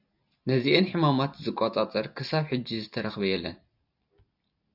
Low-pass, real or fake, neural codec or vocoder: 5.4 kHz; real; none